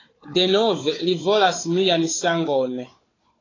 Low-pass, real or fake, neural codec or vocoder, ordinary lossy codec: 7.2 kHz; fake; codec, 16 kHz, 4 kbps, FunCodec, trained on Chinese and English, 50 frames a second; AAC, 32 kbps